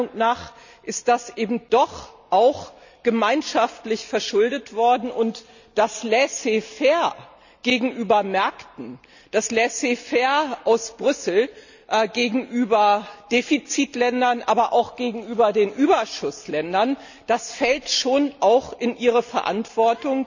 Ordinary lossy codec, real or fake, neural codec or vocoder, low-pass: none; real; none; 7.2 kHz